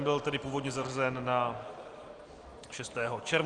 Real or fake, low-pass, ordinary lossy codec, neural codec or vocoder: real; 9.9 kHz; Opus, 24 kbps; none